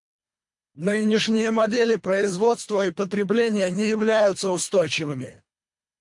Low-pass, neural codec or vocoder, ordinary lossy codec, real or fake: 10.8 kHz; codec, 24 kHz, 3 kbps, HILCodec; MP3, 96 kbps; fake